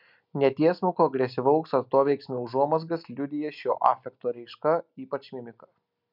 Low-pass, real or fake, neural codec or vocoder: 5.4 kHz; real; none